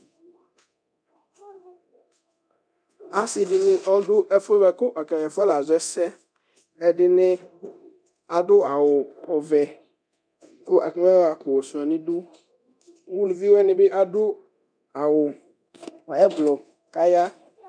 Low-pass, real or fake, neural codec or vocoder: 9.9 kHz; fake; codec, 24 kHz, 0.9 kbps, DualCodec